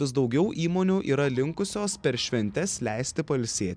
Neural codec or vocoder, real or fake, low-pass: none; real; 9.9 kHz